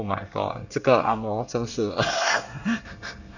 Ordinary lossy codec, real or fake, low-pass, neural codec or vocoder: none; fake; 7.2 kHz; codec, 24 kHz, 1 kbps, SNAC